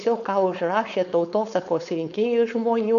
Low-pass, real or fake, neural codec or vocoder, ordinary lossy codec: 7.2 kHz; fake; codec, 16 kHz, 4.8 kbps, FACodec; MP3, 96 kbps